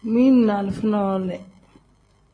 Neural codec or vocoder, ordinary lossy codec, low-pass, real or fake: none; AAC, 32 kbps; 9.9 kHz; real